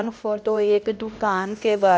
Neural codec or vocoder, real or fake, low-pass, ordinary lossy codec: codec, 16 kHz, 1 kbps, X-Codec, HuBERT features, trained on LibriSpeech; fake; none; none